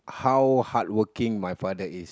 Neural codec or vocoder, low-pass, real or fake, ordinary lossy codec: none; none; real; none